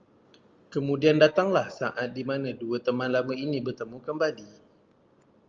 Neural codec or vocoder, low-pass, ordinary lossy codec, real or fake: none; 7.2 kHz; Opus, 32 kbps; real